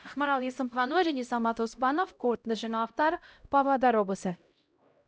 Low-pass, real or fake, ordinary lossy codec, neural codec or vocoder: none; fake; none; codec, 16 kHz, 0.5 kbps, X-Codec, HuBERT features, trained on LibriSpeech